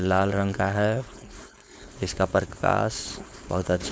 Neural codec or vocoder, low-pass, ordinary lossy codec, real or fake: codec, 16 kHz, 4.8 kbps, FACodec; none; none; fake